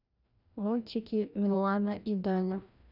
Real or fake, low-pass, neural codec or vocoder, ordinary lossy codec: fake; 5.4 kHz; codec, 16 kHz, 1 kbps, FreqCodec, larger model; none